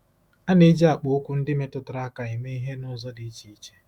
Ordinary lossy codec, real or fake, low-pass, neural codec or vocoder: Opus, 64 kbps; fake; 19.8 kHz; autoencoder, 48 kHz, 128 numbers a frame, DAC-VAE, trained on Japanese speech